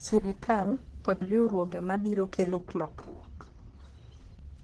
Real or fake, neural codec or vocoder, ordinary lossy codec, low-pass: fake; codec, 44.1 kHz, 1.7 kbps, Pupu-Codec; Opus, 16 kbps; 10.8 kHz